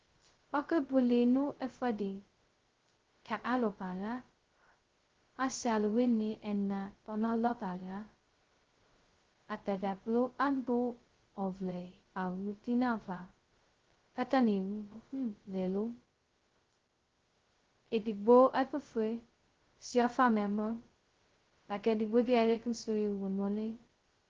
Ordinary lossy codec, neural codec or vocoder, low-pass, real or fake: Opus, 16 kbps; codec, 16 kHz, 0.2 kbps, FocalCodec; 7.2 kHz; fake